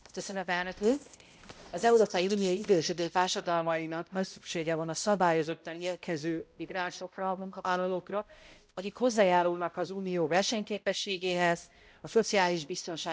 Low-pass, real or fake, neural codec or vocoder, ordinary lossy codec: none; fake; codec, 16 kHz, 0.5 kbps, X-Codec, HuBERT features, trained on balanced general audio; none